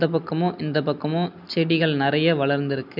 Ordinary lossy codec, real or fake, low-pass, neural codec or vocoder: none; real; 5.4 kHz; none